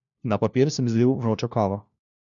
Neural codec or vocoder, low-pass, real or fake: codec, 16 kHz, 1 kbps, FunCodec, trained on LibriTTS, 50 frames a second; 7.2 kHz; fake